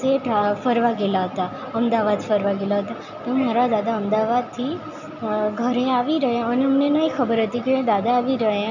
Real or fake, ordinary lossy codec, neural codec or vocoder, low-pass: real; none; none; 7.2 kHz